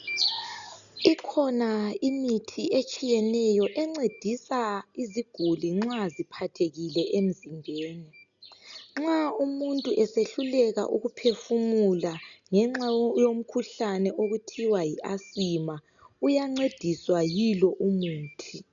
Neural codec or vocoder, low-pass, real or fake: none; 7.2 kHz; real